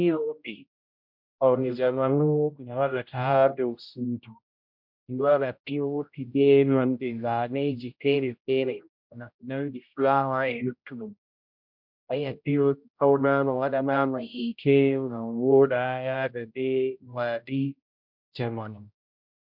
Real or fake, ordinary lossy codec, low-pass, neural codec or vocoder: fake; MP3, 48 kbps; 5.4 kHz; codec, 16 kHz, 0.5 kbps, X-Codec, HuBERT features, trained on general audio